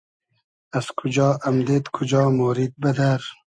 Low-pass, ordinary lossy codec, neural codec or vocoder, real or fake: 9.9 kHz; AAC, 64 kbps; none; real